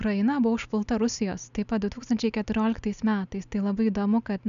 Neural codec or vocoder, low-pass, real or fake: none; 7.2 kHz; real